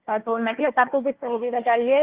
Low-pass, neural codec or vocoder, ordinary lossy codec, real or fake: 3.6 kHz; codec, 16 kHz, 1 kbps, FunCodec, trained on Chinese and English, 50 frames a second; Opus, 16 kbps; fake